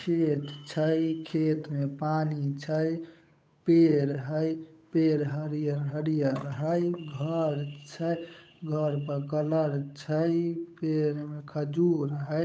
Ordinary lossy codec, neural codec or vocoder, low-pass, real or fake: none; codec, 16 kHz, 8 kbps, FunCodec, trained on Chinese and English, 25 frames a second; none; fake